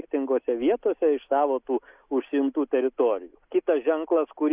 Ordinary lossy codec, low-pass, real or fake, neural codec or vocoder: Opus, 64 kbps; 3.6 kHz; real; none